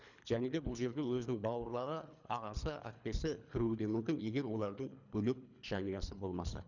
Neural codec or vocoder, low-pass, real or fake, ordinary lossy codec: codec, 24 kHz, 3 kbps, HILCodec; 7.2 kHz; fake; none